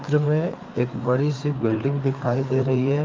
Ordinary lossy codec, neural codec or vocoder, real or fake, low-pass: Opus, 24 kbps; codec, 16 kHz, 4 kbps, FreqCodec, larger model; fake; 7.2 kHz